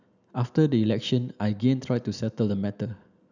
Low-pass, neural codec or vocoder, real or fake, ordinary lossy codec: 7.2 kHz; none; real; none